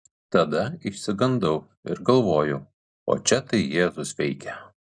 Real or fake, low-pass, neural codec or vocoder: real; 9.9 kHz; none